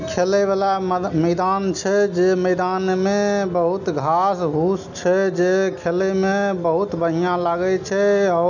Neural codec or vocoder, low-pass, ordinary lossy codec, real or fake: none; 7.2 kHz; none; real